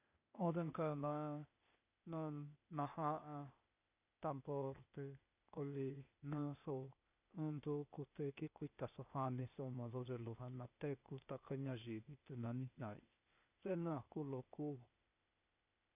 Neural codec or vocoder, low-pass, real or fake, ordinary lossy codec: codec, 16 kHz, 0.8 kbps, ZipCodec; 3.6 kHz; fake; none